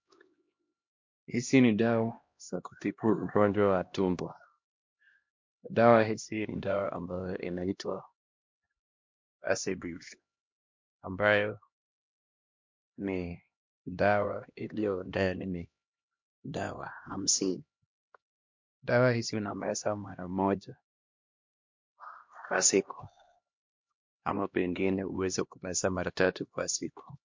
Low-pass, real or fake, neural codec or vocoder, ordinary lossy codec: 7.2 kHz; fake; codec, 16 kHz, 1 kbps, X-Codec, HuBERT features, trained on LibriSpeech; MP3, 48 kbps